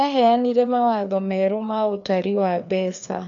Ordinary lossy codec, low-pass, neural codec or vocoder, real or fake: none; 7.2 kHz; codec, 16 kHz, 2 kbps, FreqCodec, larger model; fake